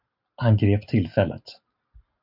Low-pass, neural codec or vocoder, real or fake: 5.4 kHz; none; real